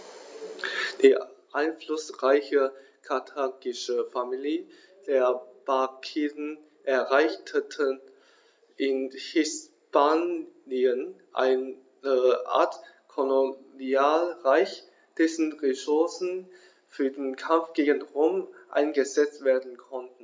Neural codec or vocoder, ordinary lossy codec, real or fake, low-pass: none; none; real; none